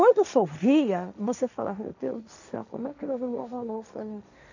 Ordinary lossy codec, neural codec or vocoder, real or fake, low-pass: none; codec, 16 kHz, 1.1 kbps, Voila-Tokenizer; fake; 7.2 kHz